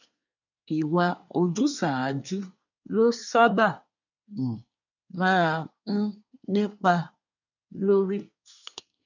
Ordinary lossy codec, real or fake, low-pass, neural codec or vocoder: none; fake; 7.2 kHz; codec, 24 kHz, 1 kbps, SNAC